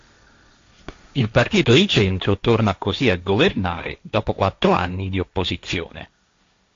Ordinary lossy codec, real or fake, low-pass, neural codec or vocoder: AAC, 48 kbps; fake; 7.2 kHz; codec, 16 kHz, 1.1 kbps, Voila-Tokenizer